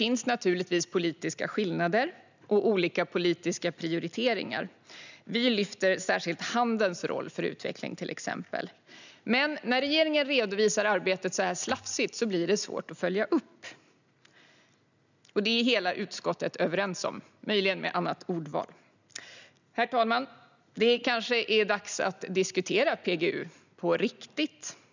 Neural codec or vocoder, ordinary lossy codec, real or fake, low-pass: none; none; real; 7.2 kHz